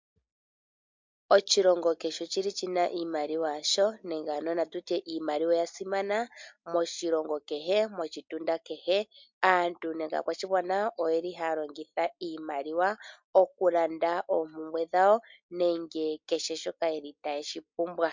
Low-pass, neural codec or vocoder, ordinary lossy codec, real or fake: 7.2 kHz; none; MP3, 64 kbps; real